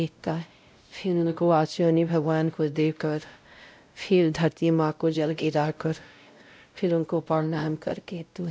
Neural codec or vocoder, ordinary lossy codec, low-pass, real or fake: codec, 16 kHz, 0.5 kbps, X-Codec, WavLM features, trained on Multilingual LibriSpeech; none; none; fake